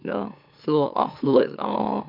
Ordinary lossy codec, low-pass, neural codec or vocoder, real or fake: MP3, 48 kbps; 5.4 kHz; autoencoder, 44.1 kHz, a latent of 192 numbers a frame, MeloTTS; fake